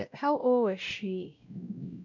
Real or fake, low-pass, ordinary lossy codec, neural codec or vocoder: fake; 7.2 kHz; none; codec, 16 kHz, 0.5 kbps, X-Codec, WavLM features, trained on Multilingual LibriSpeech